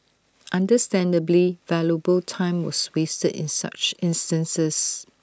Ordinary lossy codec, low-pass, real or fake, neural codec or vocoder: none; none; real; none